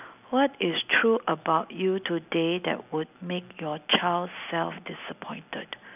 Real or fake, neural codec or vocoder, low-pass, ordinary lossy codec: real; none; 3.6 kHz; none